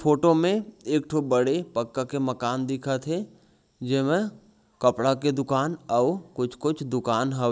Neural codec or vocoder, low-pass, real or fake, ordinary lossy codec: none; none; real; none